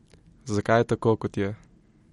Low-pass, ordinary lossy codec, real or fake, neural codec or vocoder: 19.8 kHz; MP3, 48 kbps; real; none